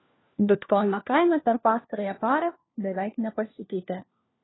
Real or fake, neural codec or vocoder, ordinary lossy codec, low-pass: fake; codec, 16 kHz, 1 kbps, FunCodec, trained on LibriTTS, 50 frames a second; AAC, 16 kbps; 7.2 kHz